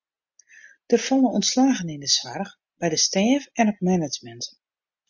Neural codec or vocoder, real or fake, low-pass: none; real; 7.2 kHz